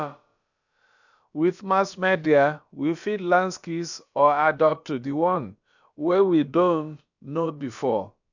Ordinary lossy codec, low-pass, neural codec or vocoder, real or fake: none; 7.2 kHz; codec, 16 kHz, about 1 kbps, DyCAST, with the encoder's durations; fake